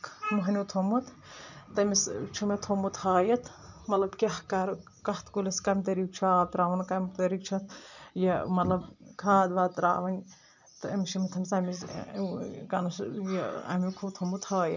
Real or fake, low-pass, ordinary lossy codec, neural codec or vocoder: real; 7.2 kHz; none; none